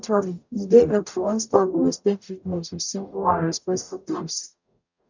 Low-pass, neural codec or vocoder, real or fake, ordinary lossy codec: 7.2 kHz; codec, 44.1 kHz, 0.9 kbps, DAC; fake; none